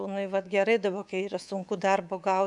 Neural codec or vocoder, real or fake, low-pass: codec, 24 kHz, 3.1 kbps, DualCodec; fake; 10.8 kHz